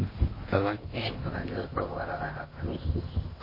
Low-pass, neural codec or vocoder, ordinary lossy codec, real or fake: 5.4 kHz; codec, 16 kHz in and 24 kHz out, 0.6 kbps, FocalCodec, streaming, 2048 codes; MP3, 24 kbps; fake